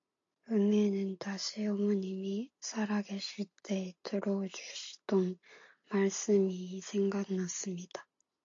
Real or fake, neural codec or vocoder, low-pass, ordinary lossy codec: real; none; 7.2 kHz; AAC, 48 kbps